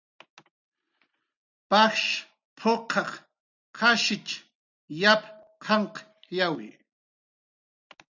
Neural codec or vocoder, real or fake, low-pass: none; real; 7.2 kHz